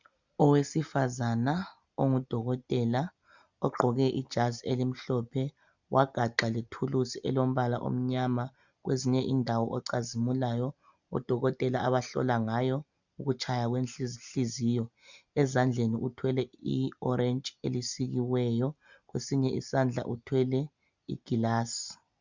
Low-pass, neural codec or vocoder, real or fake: 7.2 kHz; none; real